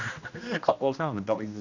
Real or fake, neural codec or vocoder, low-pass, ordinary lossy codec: fake; codec, 16 kHz, 1 kbps, X-Codec, HuBERT features, trained on general audio; 7.2 kHz; none